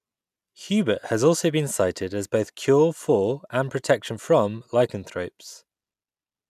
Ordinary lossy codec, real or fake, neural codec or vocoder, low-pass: none; real; none; 14.4 kHz